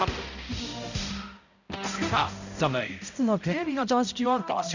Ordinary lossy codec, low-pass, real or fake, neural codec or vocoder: none; 7.2 kHz; fake; codec, 16 kHz, 0.5 kbps, X-Codec, HuBERT features, trained on balanced general audio